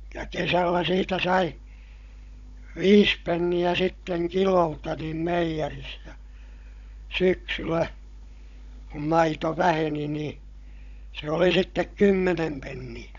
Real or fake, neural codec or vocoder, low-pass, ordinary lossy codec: fake; codec, 16 kHz, 16 kbps, FunCodec, trained on Chinese and English, 50 frames a second; 7.2 kHz; none